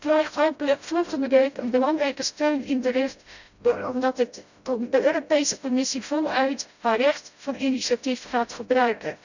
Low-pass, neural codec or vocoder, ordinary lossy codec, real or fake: 7.2 kHz; codec, 16 kHz, 0.5 kbps, FreqCodec, smaller model; none; fake